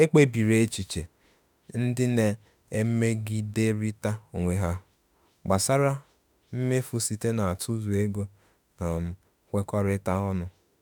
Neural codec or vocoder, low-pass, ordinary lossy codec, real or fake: autoencoder, 48 kHz, 32 numbers a frame, DAC-VAE, trained on Japanese speech; none; none; fake